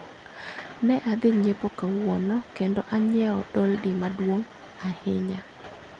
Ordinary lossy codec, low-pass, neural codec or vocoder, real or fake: Opus, 32 kbps; 9.9 kHz; none; real